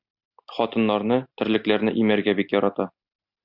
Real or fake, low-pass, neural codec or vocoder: real; 5.4 kHz; none